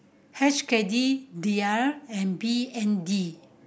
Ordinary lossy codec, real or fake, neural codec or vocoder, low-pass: none; real; none; none